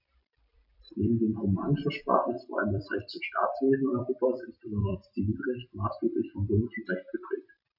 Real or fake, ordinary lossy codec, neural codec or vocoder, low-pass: fake; none; vocoder, 44.1 kHz, 128 mel bands every 256 samples, BigVGAN v2; 5.4 kHz